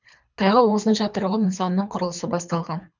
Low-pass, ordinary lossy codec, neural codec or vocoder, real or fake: 7.2 kHz; none; codec, 24 kHz, 3 kbps, HILCodec; fake